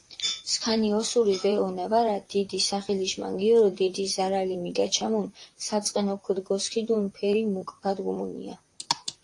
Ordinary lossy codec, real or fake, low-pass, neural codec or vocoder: AAC, 48 kbps; fake; 10.8 kHz; vocoder, 44.1 kHz, 128 mel bands, Pupu-Vocoder